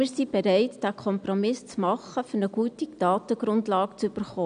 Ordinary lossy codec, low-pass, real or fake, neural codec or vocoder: none; 9.9 kHz; real; none